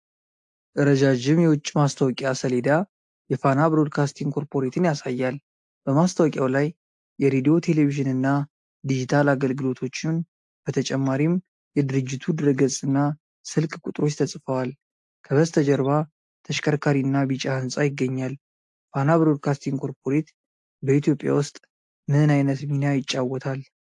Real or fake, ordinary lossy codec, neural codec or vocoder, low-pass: real; AAC, 64 kbps; none; 10.8 kHz